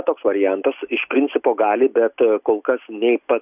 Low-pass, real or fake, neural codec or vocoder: 3.6 kHz; real; none